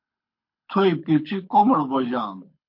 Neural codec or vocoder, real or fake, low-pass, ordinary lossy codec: codec, 24 kHz, 6 kbps, HILCodec; fake; 5.4 kHz; MP3, 32 kbps